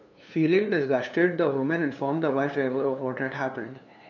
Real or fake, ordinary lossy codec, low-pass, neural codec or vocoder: fake; none; 7.2 kHz; codec, 16 kHz, 2 kbps, FunCodec, trained on LibriTTS, 25 frames a second